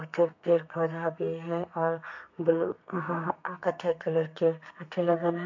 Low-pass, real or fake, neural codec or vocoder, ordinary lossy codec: 7.2 kHz; fake; codec, 32 kHz, 1.9 kbps, SNAC; MP3, 48 kbps